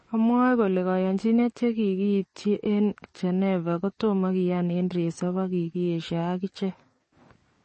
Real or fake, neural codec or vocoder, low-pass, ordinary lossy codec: fake; codec, 44.1 kHz, 7.8 kbps, DAC; 10.8 kHz; MP3, 32 kbps